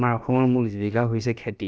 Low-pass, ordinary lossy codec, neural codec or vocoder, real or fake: none; none; codec, 16 kHz, about 1 kbps, DyCAST, with the encoder's durations; fake